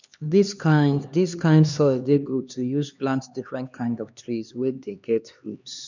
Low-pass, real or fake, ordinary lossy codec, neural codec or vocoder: 7.2 kHz; fake; none; codec, 16 kHz, 2 kbps, X-Codec, HuBERT features, trained on LibriSpeech